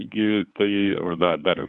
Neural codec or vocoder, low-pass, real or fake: codec, 24 kHz, 0.9 kbps, WavTokenizer, medium speech release version 1; 10.8 kHz; fake